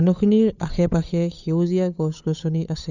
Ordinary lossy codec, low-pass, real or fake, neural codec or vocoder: none; 7.2 kHz; fake; codec, 16 kHz, 8 kbps, FunCodec, trained on Chinese and English, 25 frames a second